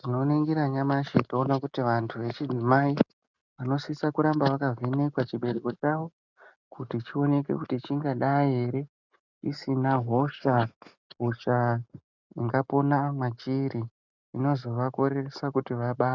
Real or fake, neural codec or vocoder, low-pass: real; none; 7.2 kHz